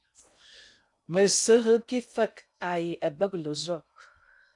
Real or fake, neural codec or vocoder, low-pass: fake; codec, 16 kHz in and 24 kHz out, 0.6 kbps, FocalCodec, streaming, 2048 codes; 10.8 kHz